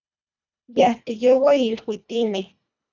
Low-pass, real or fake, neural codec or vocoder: 7.2 kHz; fake; codec, 24 kHz, 1.5 kbps, HILCodec